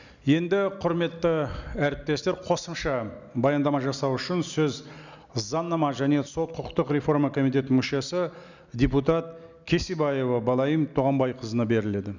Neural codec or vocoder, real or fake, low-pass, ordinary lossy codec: none; real; 7.2 kHz; none